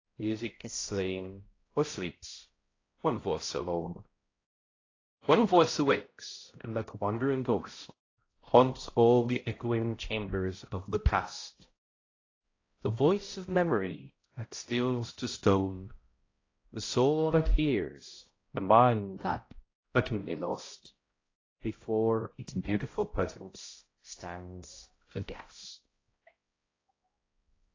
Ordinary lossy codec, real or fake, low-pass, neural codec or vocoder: AAC, 32 kbps; fake; 7.2 kHz; codec, 16 kHz, 0.5 kbps, X-Codec, HuBERT features, trained on balanced general audio